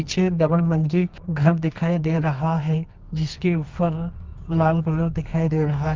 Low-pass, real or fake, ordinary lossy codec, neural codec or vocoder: 7.2 kHz; fake; Opus, 24 kbps; codec, 24 kHz, 0.9 kbps, WavTokenizer, medium music audio release